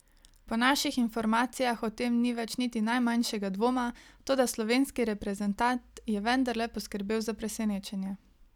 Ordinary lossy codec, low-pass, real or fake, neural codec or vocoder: none; 19.8 kHz; real; none